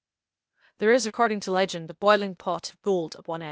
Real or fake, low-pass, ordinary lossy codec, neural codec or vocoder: fake; none; none; codec, 16 kHz, 0.8 kbps, ZipCodec